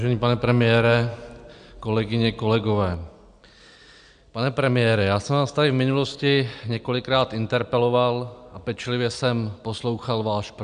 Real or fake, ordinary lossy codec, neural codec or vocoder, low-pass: real; MP3, 96 kbps; none; 9.9 kHz